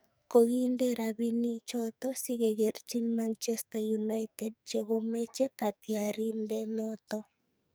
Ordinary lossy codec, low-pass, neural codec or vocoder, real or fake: none; none; codec, 44.1 kHz, 2.6 kbps, SNAC; fake